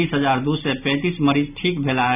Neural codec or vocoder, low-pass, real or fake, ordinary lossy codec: none; 3.6 kHz; real; none